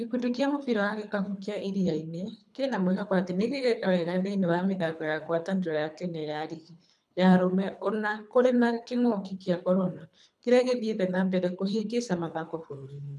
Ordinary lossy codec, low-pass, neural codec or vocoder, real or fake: none; none; codec, 24 kHz, 3 kbps, HILCodec; fake